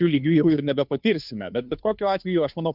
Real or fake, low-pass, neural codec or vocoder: fake; 5.4 kHz; codec, 16 kHz, 2 kbps, FunCodec, trained on Chinese and English, 25 frames a second